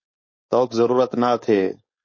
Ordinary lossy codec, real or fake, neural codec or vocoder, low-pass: MP3, 32 kbps; fake; codec, 16 kHz, 4.8 kbps, FACodec; 7.2 kHz